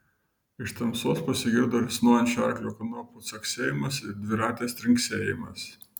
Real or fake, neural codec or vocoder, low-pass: real; none; 19.8 kHz